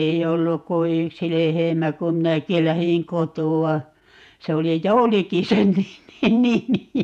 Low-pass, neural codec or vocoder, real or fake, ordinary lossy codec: 14.4 kHz; vocoder, 48 kHz, 128 mel bands, Vocos; fake; none